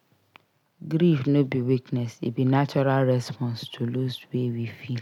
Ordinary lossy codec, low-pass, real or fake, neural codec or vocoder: none; 19.8 kHz; real; none